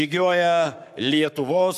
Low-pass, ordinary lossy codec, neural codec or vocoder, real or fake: 14.4 kHz; AAC, 96 kbps; codec, 44.1 kHz, 7.8 kbps, Pupu-Codec; fake